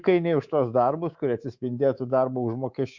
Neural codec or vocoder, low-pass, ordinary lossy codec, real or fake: codec, 24 kHz, 3.1 kbps, DualCodec; 7.2 kHz; Opus, 64 kbps; fake